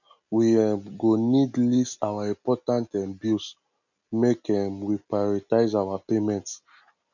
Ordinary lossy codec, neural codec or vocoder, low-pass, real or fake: none; none; 7.2 kHz; real